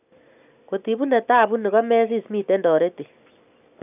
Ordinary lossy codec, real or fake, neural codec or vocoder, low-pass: none; real; none; 3.6 kHz